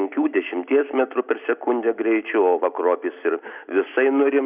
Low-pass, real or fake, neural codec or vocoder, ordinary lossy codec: 3.6 kHz; real; none; Opus, 64 kbps